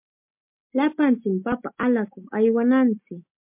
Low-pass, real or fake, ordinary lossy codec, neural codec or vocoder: 3.6 kHz; real; MP3, 32 kbps; none